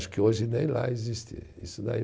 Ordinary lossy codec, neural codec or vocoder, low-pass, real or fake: none; none; none; real